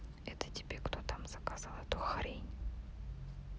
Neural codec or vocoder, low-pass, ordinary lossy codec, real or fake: none; none; none; real